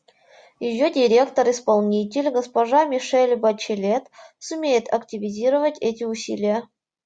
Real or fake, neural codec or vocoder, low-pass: real; none; 9.9 kHz